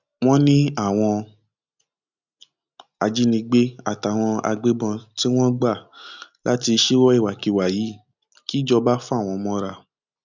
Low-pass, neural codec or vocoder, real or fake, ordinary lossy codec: 7.2 kHz; none; real; none